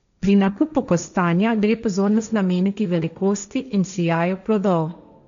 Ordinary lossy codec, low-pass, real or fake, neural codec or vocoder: none; 7.2 kHz; fake; codec, 16 kHz, 1.1 kbps, Voila-Tokenizer